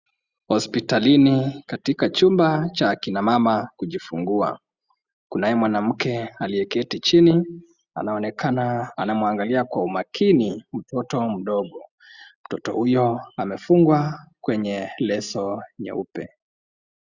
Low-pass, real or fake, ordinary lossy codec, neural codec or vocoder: 7.2 kHz; real; Opus, 64 kbps; none